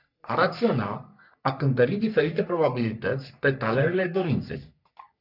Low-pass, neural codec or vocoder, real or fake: 5.4 kHz; codec, 44.1 kHz, 3.4 kbps, Pupu-Codec; fake